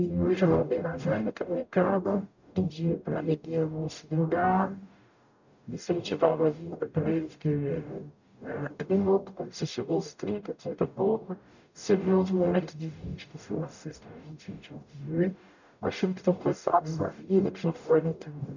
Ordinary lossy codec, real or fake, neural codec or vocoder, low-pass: none; fake; codec, 44.1 kHz, 0.9 kbps, DAC; 7.2 kHz